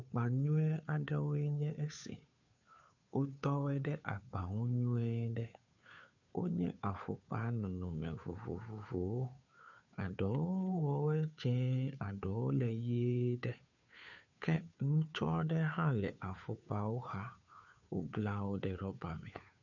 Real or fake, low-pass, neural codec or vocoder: fake; 7.2 kHz; codec, 16 kHz, 2 kbps, FunCodec, trained on Chinese and English, 25 frames a second